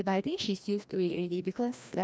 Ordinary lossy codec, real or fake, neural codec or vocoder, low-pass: none; fake; codec, 16 kHz, 1 kbps, FreqCodec, larger model; none